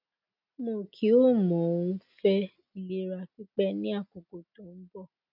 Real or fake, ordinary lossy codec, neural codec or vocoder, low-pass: real; none; none; 5.4 kHz